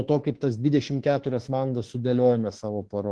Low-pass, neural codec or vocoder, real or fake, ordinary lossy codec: 10.8 kHz; autoencoder, 48 kHz, 32 numbers a frame, DAC-VAE, trained on Japanese speech; fake; Opus, 16 kbps